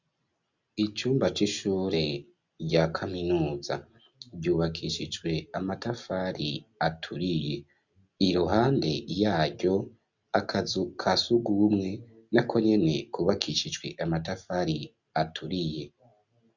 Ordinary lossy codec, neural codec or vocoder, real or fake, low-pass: Opus, 64 kbps; none; real; 7.2 kHz